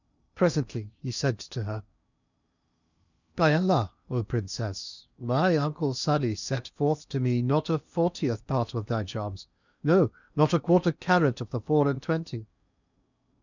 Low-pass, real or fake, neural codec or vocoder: 7.2 kHz; fake; codec, 16 kHz in and 24 kHz out, 0.8 kbps, FocalCodec, streaming, 65536 codes